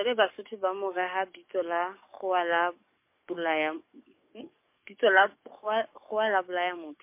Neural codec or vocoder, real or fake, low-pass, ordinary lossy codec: codec, 44.1 kHz, 7.8 kbps, DAC; fake; 3.6 kHz; MP3, 24 kbps